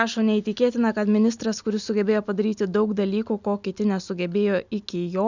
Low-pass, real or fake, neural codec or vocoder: 7.2 kHz; real; none